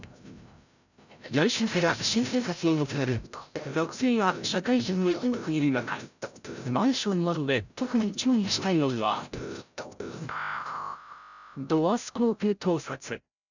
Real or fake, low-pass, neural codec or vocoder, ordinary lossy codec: fake; 7.2 kHz; codec, 16 kHz, 0.5 kbps, FreqCodec, larger model; none